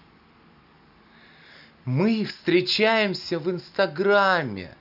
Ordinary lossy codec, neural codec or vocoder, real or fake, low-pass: none; none; real; 5.4 kHz